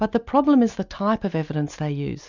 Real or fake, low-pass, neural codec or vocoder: real; 7.2 kHz; none